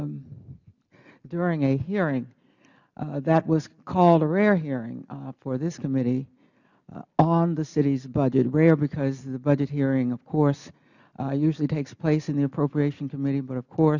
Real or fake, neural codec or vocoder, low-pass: real; none; 7.2 kHz